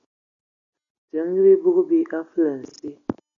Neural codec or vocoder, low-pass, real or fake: none; 7.2 kHz; real